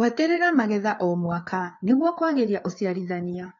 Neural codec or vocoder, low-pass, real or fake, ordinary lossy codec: codec, 16 kHz, 4 kbps, FreqCodec, larger model; 7.2 kHz; fake; AAC, 32 kbps